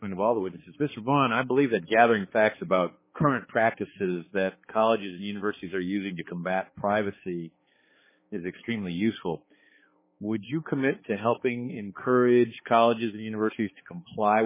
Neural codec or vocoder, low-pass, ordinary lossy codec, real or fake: codec, 16 kHz, 4 kbps, X-Codec, HuBERT features, trained on balanced general audio; 3.6 kHz; MP3, 16 kbps; fake